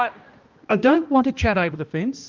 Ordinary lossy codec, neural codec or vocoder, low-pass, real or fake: Opus, 24 kbps; codec, 16 kHz, 1 kbps, X-Codec, HuBERT features, trained on balanced general audio; 7.2 kHz; fake